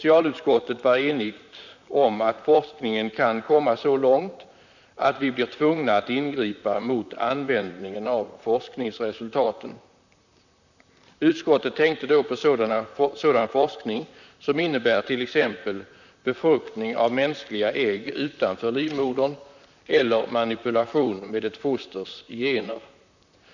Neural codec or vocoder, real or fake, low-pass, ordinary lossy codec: vocoder, 44.1 kHz, 128 mel bands, Pupu-Vocoder; fake; 7.2 kHz; none